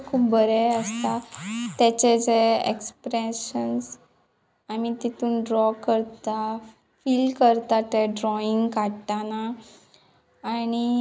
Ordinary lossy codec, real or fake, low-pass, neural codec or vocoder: none; real; none; none